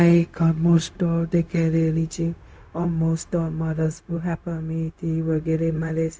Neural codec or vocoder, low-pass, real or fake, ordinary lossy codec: codec, 16 kHz, 0.4 kbps, LongCat-Audio-Codec; none; fake; none